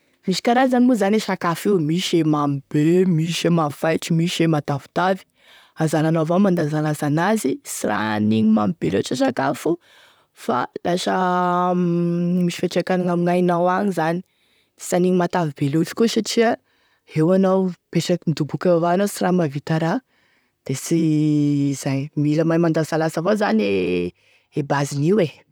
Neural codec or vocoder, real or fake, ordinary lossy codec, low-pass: vocoder, 44.1 kHz, 128 mel bands, Pupu-Vocoder; fake; none; none